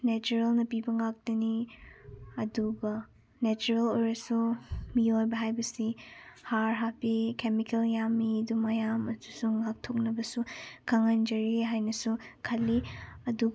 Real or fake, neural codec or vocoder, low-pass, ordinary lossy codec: real; none; none; none